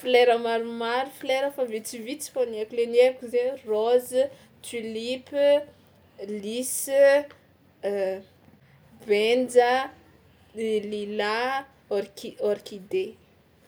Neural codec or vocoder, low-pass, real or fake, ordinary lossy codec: none; none; real; none